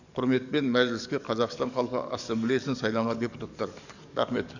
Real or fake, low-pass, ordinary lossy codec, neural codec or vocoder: fake; 7.2 kHz; none; codec, 44.1 kHz, 7.8 kbps, DAC